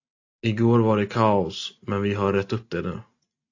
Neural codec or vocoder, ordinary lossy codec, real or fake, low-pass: none; AAC, 48 kbps; real; 7.2 kHz